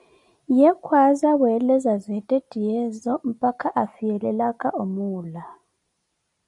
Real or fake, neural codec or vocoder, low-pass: real; none; 10.8 kHz